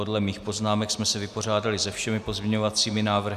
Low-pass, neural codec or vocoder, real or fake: 14.4 kHz; none; real